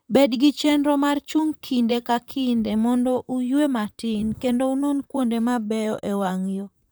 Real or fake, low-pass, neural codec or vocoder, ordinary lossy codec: fake; none; vocoder, 44.1 kHz, 128 mel bands, Pupu-Vocoder; none